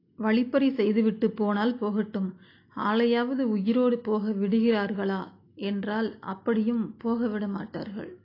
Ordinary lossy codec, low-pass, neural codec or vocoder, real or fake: AAC, 32 kbps; 5.4 kHz; none; real